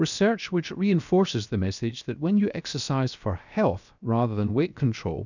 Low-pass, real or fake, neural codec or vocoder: 7.2 kHz; fake; codec, 16 kHz, 0.7 kbps, FocalCodec